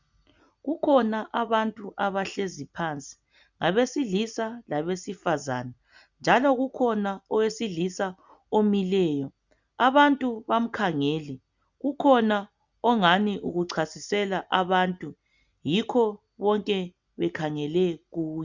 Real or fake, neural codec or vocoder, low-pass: real; none; 7.2 kHz